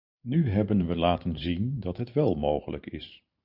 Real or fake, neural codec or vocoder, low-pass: fake; vocoder, 44.1 kHz, 128 mel bands every 512 samples, BigVGAN v2; 5.4 kHz